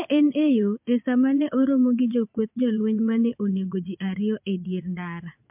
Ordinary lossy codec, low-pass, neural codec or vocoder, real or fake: MP3, 32 kbps; 3.6 kHz; vocoder, 22.05 kHz, 80 mel bands, Vocos; fake